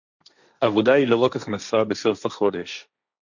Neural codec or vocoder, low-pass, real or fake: codec, 16 kHz, 1.1 kbps, Voila-Tokenizer; 7.2 kHz; fake